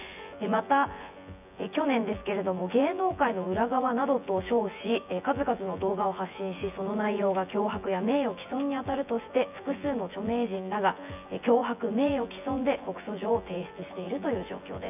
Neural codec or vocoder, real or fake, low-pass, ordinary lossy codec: vocoder, 24 kHz, 100 mel bands, Vocos; fake; 3.6 kHz; none